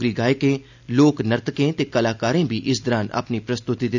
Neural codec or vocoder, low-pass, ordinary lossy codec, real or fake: none; 7.2 kHz; none; real